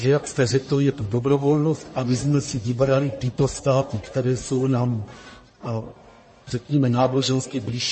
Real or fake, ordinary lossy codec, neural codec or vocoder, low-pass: fake; MP3, 32 kbps; codec, 44.1 kHz, 1.7 kbps, Pupu-Codec; 10.8 kHz